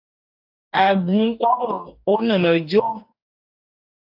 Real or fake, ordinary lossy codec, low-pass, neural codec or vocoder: fake; AAC, 48 kbps; 5.4 kHz; codec, 44.1 kHz, 2.6 kbps, DAC